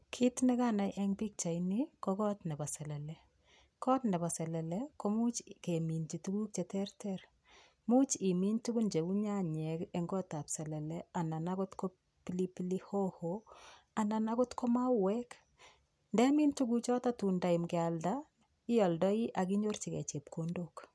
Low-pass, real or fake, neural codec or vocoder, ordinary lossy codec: none; real; none; none